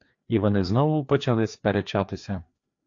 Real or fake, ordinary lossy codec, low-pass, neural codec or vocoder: fake; AAC, 48 kbps; 7.2 kHz; codec, 16 kHz, 2 kbps, FreqCodec, larger model